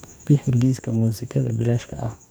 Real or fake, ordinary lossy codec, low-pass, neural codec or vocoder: fake; none; none; codec, 44.1 kHz, 2.6 kbps, DAC